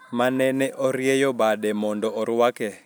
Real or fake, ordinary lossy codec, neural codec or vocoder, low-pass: real; none; none; none